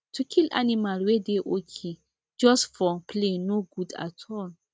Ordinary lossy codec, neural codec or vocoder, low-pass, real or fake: none; none; none; real